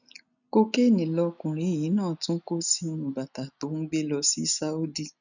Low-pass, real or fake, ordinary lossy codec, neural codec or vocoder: 7.2 kHz; real; none; none